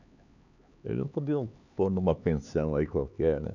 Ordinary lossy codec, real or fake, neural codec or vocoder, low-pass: none; fake; codec, 16 kHz, 4 kbps, X-Codec, HuBERT features, trained on LibriSpeech; 7.2 kHz